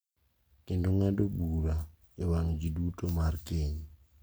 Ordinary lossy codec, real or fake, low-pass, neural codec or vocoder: none; real; none; none